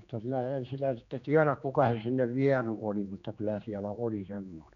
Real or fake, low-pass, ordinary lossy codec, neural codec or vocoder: fake; 7.2 kHz; none; codec, 16 kHz, 2 kbps, X-Codec, HuBERT features, trained on general audio